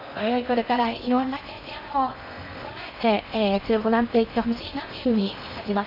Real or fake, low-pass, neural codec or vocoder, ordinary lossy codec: fake; 5.4 kHz; codec, 16 kHz in and 24 kHz out, 0.6 kbps, FocalCodec, streaming, 4096 codes; none